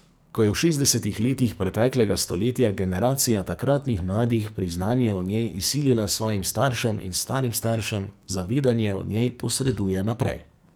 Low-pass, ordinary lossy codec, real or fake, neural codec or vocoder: none; none; fake; codec, 44.1 kHz, 2.6 kbps, SNAC